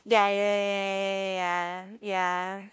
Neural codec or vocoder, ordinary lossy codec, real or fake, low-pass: codec, 16 kHz, 0.5 kbps, FunCodec, trained on LibriTTS, 25 frames a second; none; fake; none